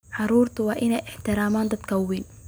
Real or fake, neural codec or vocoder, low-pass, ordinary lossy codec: real; none; none; none